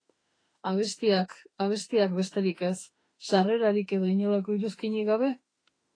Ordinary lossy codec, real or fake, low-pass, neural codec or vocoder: AAC, 32 kbps; fake; 9.9 kHz; autoencoder, 48 kHz, 32 numbers a frame, DAC-VAE, trained on Japanese speech